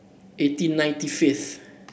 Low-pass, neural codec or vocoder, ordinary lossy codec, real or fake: none; none; none; real